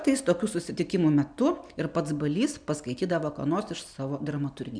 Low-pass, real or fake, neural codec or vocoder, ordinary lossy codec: 9.9 kHz; real; none; MP3, 96 kbps